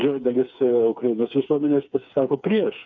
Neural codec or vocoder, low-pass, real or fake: codec, 16 kHz, 4 kbps, FreqCodec, smaller model; 7.2 kHz; fake